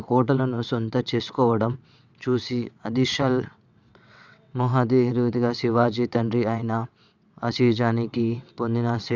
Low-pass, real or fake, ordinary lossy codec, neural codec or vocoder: 7.2 kHz; fake; none; vocoder, 22.05 kHz, 80 mel bands, WaveNeXt